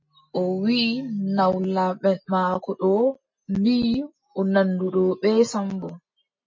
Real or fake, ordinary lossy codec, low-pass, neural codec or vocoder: real; MP3, 32 kbps; 7.2 kHz; none